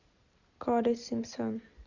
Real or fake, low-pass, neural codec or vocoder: real; 7.2 kHz; none